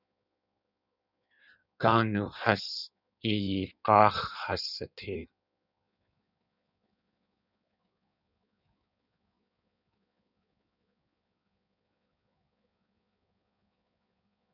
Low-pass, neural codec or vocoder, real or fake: 5.4 kHz; codec, 16 kHz in and 24 kHz out, 1.1 kbps, FireRedTTS-2 codec; fake